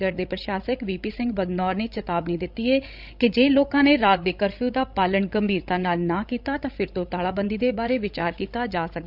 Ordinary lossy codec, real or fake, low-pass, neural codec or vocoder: none; fake; 5.4 kHz; codec, 16 kHz, 16 kbps, FreqCodec, larger model